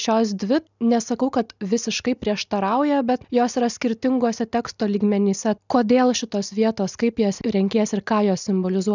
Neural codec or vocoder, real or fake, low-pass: none; real; 7.2 kHz